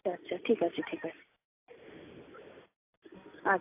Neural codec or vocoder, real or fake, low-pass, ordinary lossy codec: none; real; 3.6 kHz; AAC, 32 kbps